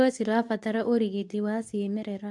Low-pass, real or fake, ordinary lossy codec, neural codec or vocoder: none; fake; none; codec, 24 kHz, 0.9 kbps, WavTokenizer, medium speech release version 2